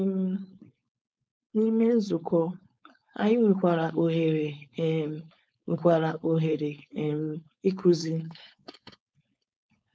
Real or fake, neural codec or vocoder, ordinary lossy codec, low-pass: fake; codec, 16 kHz, 4.8 kbps, FACodec; none; none